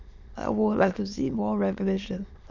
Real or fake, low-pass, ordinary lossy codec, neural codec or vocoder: fake; 7.2 kHz; none; autoencoder, 22.05 kHz, a latent of 192 numbers a frame, VITS, trained on many speakers